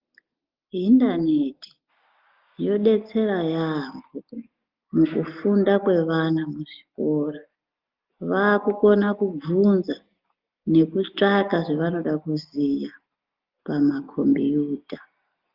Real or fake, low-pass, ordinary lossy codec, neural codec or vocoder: real; 5.4 kHz; Opus, 32 kbps; none